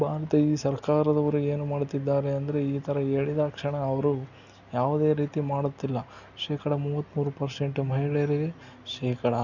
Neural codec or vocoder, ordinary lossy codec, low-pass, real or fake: none; none; 7.2 kHz; real